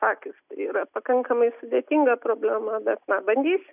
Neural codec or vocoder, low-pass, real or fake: none; 3.6 kHz; real